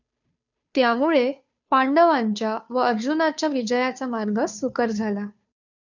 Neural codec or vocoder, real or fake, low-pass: codec, 16 kHz, 2 kbps, FunCodec, trained on Chinese and English, 25 frames a second; fake; 7.2 kHz